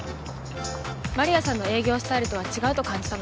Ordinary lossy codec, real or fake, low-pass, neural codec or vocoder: none; real; none; none